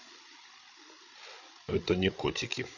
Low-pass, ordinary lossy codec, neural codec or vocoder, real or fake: 7.2 kHz; none; codec, 16 kHz, 16 kbps, FreqCodec, larger model; fake